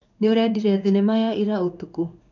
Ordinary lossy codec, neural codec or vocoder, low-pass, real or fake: none; codec, 16 kHz in and 24 kHz out, 1 kbps, XY-Tokenizer; 7.2 kHz; fake